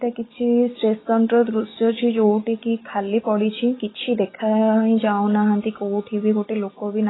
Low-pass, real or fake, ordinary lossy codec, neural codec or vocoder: 7.2 kHz; real; AAC, 16 kbps; none